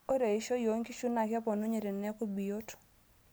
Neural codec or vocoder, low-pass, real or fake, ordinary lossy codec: none; none; real; none